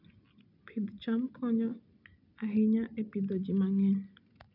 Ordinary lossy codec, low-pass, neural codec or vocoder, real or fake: none; 5.4 kHz; none; real